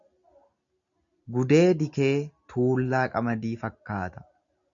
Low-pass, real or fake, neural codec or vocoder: 7.2 kHz; real; none